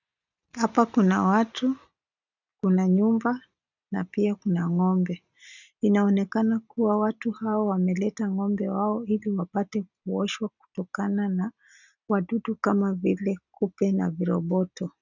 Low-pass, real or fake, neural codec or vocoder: 7.2 kHz; real; none